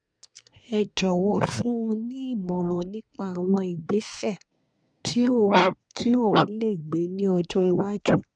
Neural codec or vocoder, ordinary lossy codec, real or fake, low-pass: codec, 24 kHz, 1 kbps, SNAC; none; fake; 9.9 kHz